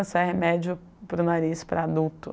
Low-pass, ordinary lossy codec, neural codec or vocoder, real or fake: none; none; none; real